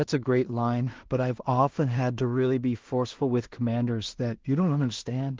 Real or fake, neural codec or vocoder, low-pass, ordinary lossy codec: fake; codec, 16 kHz in and 24 kHz out, 0.4 kbps, LongCat-Audio-Codec, two codebook decoder; 7.2 kHz; Opus, 16 kbps